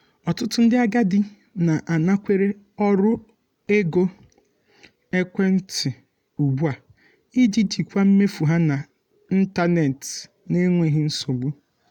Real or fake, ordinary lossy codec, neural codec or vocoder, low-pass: real; none; none; 19.8 kHz